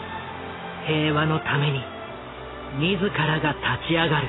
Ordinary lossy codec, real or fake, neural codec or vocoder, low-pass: AAC, 16 kbps; real; none; 7.2 kHz